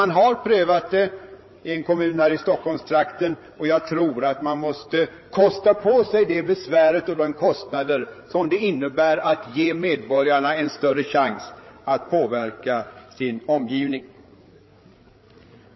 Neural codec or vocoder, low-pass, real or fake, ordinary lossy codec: codec, 16 kHz, 8 kbps, FreqCodec, larger model; 7.2 kHz; fake; MP3, 24 kbps